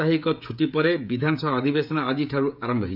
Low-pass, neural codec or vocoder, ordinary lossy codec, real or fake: 5.4 kHz; codec, 16 kHz, 16 kbps, FreqCodec, smaller model; none; fake